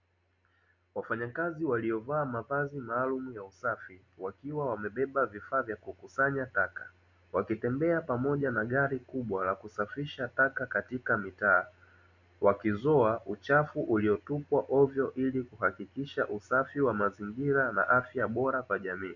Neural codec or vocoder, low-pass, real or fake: none; 7.2 kHz; real